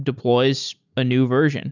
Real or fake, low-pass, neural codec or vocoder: real; 7.2 kHz; none